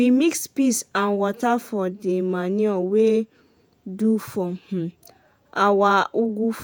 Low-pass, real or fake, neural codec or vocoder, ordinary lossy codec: none; fake; vocoder, 48 kHz, 128 mel bands, Vocos; none